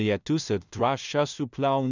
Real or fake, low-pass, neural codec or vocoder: fake; 7.2 kHz; codec, 16 kHz in and 24 kHz out, 0.4 kbps, LongCat-Audio-Codec, two codebook decoder